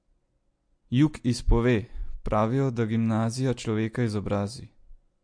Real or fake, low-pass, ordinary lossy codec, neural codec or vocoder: real; 9.9 kHz; MP3, 48 kbps; none